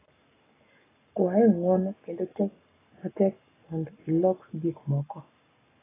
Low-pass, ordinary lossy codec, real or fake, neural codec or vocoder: 3.6 kHz; AAC, 16 kbps; fake; codec, 44.1 kHz, 7.8 kbps, Pupu-Codec